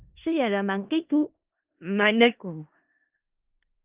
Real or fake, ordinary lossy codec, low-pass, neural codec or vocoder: fake; Opus, 32 kbps; 3.6 kHz; codec, 16 kHz in and 24 kHz out, 0.4 kbps, LongCat-Audio-Codec, four codebook decoder